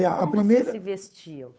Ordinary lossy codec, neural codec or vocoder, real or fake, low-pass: none; codec, 16 kHz, 8 kbps, FunCodec, trained on Chinese and English, 25 frames a second; fake; none